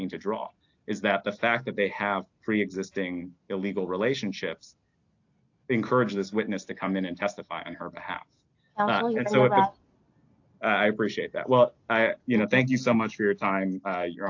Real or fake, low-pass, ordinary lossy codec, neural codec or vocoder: real; 7.2 kHz; AAC, 48 kbps; none